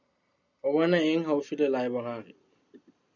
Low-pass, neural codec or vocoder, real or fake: 7.2 kHz; none; real